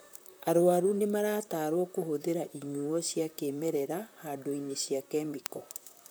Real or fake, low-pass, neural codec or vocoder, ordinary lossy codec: fake; none; vocoder, 44.1 kHz, 128 mel bands, Pupu-Vocoder; none